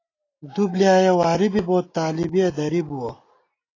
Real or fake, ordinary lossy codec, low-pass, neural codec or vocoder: real; AAC, 32 kbps; 7.2 kHz; none